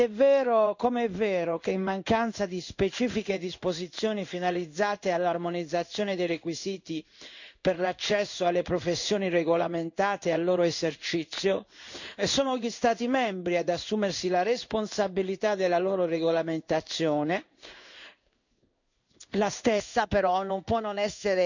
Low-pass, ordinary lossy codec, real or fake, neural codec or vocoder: 7.2 kHz; none; fake; codec, 16 kHz in and 24 kHz out, 1 kbps, XY-Tokenizer